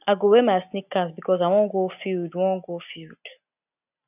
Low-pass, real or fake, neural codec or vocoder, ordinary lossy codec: 3.6 kHz; real; none; none